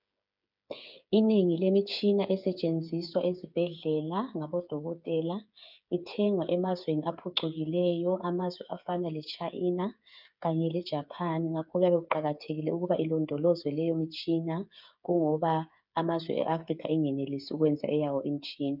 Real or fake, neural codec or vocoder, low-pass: fake; codec, 16 kHz, 8 kbps, FreqCodec, smaller model; 5.4 kHz